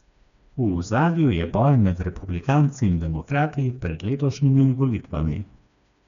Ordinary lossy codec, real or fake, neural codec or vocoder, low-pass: none; fake; codec, 16 kHz, 2 kbps, FreqCodec, smaller model; 7.2 kHz